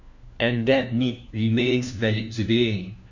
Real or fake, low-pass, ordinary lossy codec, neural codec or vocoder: fake; 7.2 kHz; none; codec, 16 kHz, 1 kbps, FunCodec, trained on LibriTTS, 50 frames a second